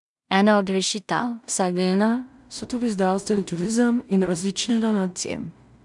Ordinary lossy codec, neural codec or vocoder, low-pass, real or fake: none; codec, 16 kHz in and 24 kHz out, 0.4 kbps, LongCat-Audio-Codec, two codebook decoder; 10.8 kHz; fake